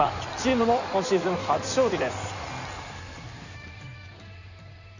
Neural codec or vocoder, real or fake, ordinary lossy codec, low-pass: codec, 16 kHz in and 24 kHz out, 2.2 kbps, FireRedTTS-2 codec; fake; none; 7.2 kHz